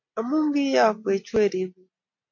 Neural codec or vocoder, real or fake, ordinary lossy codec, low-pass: none; real; MP3, 48 kbps; 7.2 kHz